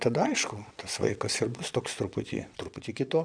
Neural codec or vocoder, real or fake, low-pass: vocoder, 22.05 kHz, 80 mel bands, WaveNeXt; fake; 9.9 kHz